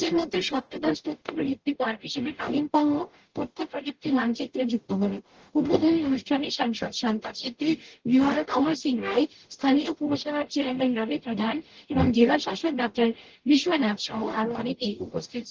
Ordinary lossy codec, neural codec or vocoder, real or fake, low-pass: Opus, 16 kbps; codec, 44.1 kHz, 0.9 kbps, DAC; fake; 7.2 kHz